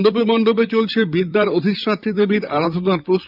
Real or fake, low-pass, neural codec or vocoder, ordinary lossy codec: fake; 5.4 kHz; vocoder, 44.1 kHz, 128 mel bands, Pupu-Vocoder; none